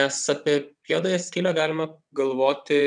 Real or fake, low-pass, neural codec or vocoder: fake; 9.9 kHz; vocoder, 22.05 kHz, 80 mel bands, WaveNeXt